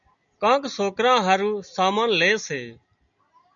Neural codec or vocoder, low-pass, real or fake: none; 7.2 kHz; real